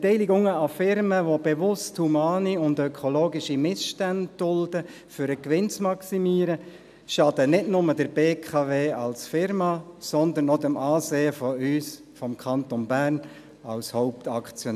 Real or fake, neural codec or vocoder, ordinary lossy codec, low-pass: real; none; none; 14.4 kHz